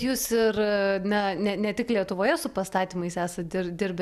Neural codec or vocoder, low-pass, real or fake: none; 14.4 kHz; real